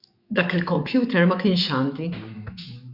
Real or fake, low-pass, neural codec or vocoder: fake; 5.4 kHz; autoencoder, 48 kHz, 128 numbers a frame, DAC-VAE, trained on Japanese speech